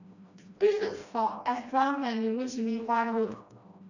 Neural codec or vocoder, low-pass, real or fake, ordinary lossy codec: codec, 16 kHz, 1 kbps, FreqCodec, smaller model; 7.2 kHz; fake; none